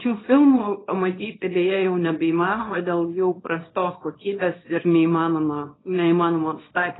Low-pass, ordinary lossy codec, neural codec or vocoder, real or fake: 7.2 kHz; AAC, 16 kbps; codec, 24 kHz, 0.9 kbps, WavTokenizer, small release; fake